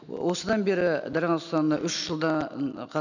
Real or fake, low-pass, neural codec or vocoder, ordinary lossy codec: real; 7.2 kHz; none; none